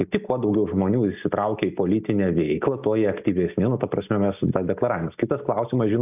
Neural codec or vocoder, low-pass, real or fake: none; 3.6 kHz; real